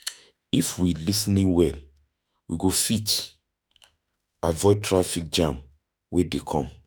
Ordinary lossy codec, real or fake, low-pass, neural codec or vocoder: none; fake; none; autoencoder, 48 kHz, 32 numbers a frame, DAC-VAE, trained on Japanese speech